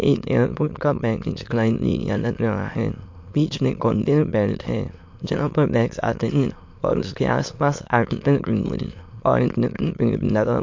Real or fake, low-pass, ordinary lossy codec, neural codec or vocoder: fake; 7.2 kHz; MP3, 48 kbps; autoencoder, 22.05 kHz, a latent of 192 numbers a frame, VITS, trained on many speakers